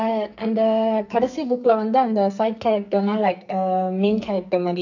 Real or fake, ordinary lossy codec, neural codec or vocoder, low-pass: fake; none; codec, 44.1 kHz, 2.6 kbps, SNAC; 7.2 kHz